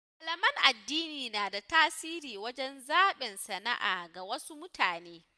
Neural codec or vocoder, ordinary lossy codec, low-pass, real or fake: none; none; none; real